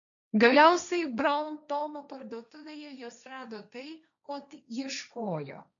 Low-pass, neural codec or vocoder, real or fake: 7.2 kHz; codec, 16 kHz, 1.1 kbps, Voila-Tokenizer; fake